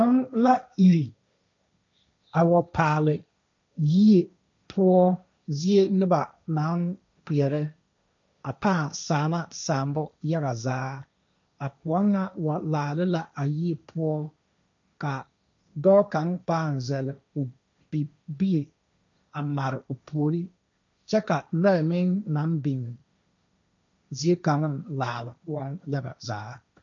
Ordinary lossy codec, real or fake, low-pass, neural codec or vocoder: MP3, 64 kbps; fake; 7.2 kHz; codec, 16 kHz, 1.1 kbps, Voila-Tokenizer